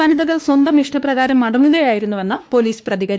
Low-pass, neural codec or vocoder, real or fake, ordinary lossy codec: none; codec, 16 kHz, 2 kbps, X-Codec, WavLM features, trained on Multilingual LibriSpeech; fake; none